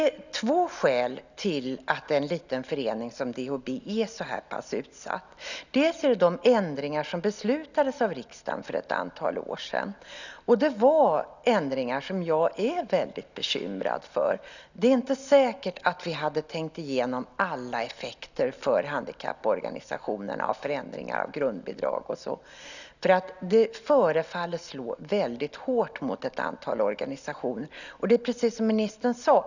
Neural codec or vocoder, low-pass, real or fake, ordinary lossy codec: none; 7.2 kHz; real; none